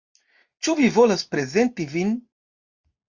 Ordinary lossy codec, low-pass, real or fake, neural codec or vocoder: Opus, 64 kbps; 7.2 kHz; real; none